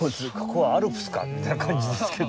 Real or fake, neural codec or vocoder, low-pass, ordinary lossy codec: real; none; none; none